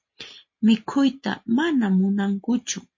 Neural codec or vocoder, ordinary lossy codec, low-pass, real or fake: none; MP3, 32 kbps; 7.2 kHz; real